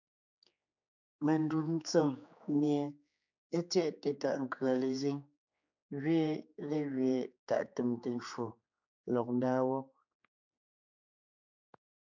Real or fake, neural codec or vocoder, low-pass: fake; codec, 16 kHz, 4 kbps, X-Codec, HuBERT features, trained on general audio; 7.2 kHz